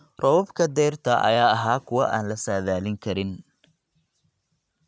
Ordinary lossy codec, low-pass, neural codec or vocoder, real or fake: none; none; none; real